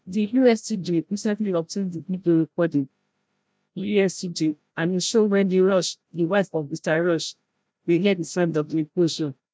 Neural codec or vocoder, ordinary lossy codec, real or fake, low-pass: codec, 16 kHz, 0.5 kbps, FreqCodec, larger model; none; fake; none